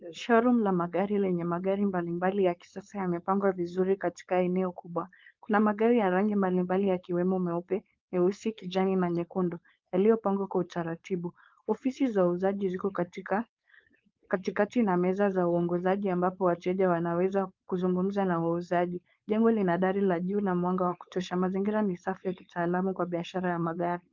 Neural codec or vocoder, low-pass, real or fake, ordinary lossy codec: codec, 16 kHz, 4.8 kbps, FACodec; 7.2 kHz; fake; Opus, 32 kbps